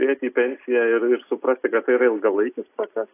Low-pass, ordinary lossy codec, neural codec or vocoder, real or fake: 3.6 kHz; AAC, 24 kbps; none; real